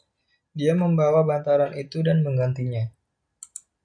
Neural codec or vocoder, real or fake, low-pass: none; real; 9.9 kHz